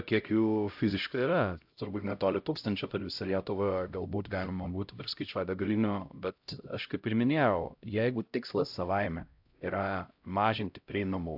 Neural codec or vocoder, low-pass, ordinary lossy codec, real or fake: codec, 16 kHz, 0.5 kbps, X-Codec, HuBERT features, trained on LibriSpeech; 5.4 kHz; AAC, 48 kbps; fake